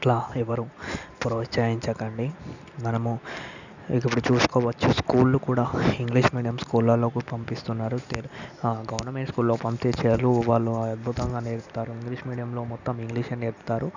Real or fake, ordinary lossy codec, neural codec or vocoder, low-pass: real; none; none; 7.2 kHz